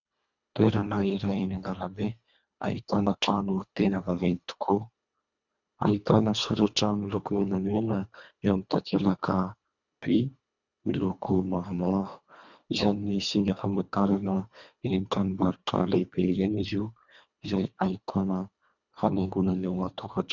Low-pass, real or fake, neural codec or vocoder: 7.2 kHz; fake; codec, 24 kHz, 1.5 kbps, HILCodec